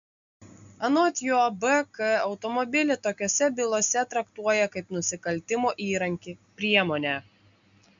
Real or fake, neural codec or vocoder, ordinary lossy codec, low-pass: real; none; MP3, 64 kbps; 7.2 kHz